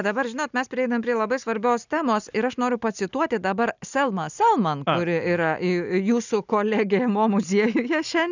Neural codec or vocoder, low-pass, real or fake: none; 7.2 kHz; real